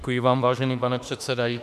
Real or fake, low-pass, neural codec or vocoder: fake; 14.4 kHz; autoencoder, 48 kHz, 32 numbers a frame, DAC-VAE, trained on Japanese speech